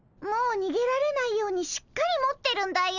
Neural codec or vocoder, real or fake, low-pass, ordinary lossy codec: none; real; 7.2 kHz; none